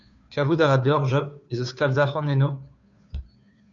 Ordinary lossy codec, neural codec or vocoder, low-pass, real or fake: MP3, 96 kbps; codec, 16 kHz, 2 kbps, FunCodec, trained on Chinese and English, 25 frames a second; 7.2 kHz; fake